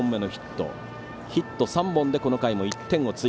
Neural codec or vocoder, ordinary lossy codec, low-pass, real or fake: none; none; none; real